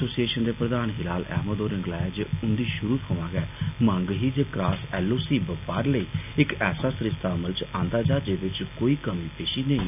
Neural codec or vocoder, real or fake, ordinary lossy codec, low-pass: none; real; none; 3.6 kHz